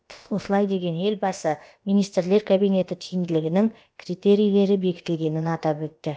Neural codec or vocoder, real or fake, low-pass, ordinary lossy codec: codec, 16 kHz, about 1 kbps, DyCAST, with the encoder's durations; fake; none; none